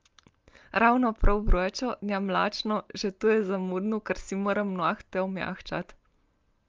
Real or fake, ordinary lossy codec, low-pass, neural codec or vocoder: real; Opus, 32 kbps; 7.2 kHz; none